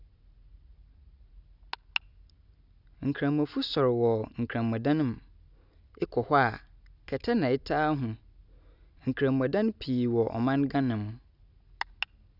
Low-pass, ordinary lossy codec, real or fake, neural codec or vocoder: 5.4 kHz; none; real; none